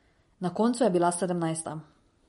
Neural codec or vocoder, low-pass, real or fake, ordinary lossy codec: none; 19.8 kHz; real; MP3, 48 kbps